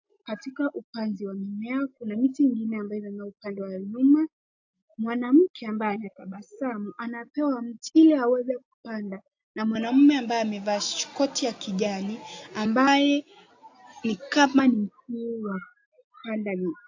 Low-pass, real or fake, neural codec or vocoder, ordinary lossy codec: 7.2 kHz; real; none; AAC, 48 kbps